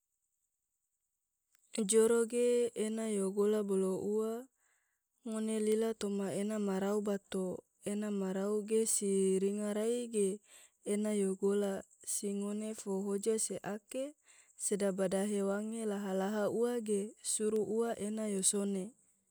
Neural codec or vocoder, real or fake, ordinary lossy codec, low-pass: none; real; none; none